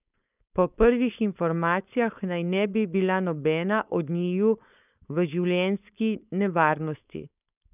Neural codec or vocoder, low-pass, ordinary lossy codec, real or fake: codec, 16 kHz, 4.8 kbps, FACodec; 3.6 kHz; none; fake